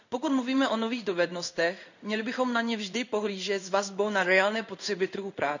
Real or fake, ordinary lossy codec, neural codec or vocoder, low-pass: fake; none; codec, 16 kHz in and 24 kHz out, 1 kbps, XY-Tokenizer; 7.2 kHz